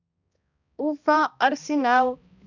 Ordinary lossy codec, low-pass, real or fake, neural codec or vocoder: none; 7.2 kHz; fake; codec, 16 kHz, 1 kbps, X-Codec, HuBERT features, trained on balanced general audio